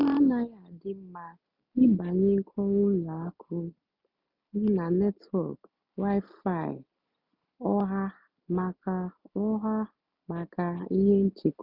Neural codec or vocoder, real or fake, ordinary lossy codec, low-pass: none; real; none; 5.4 kHz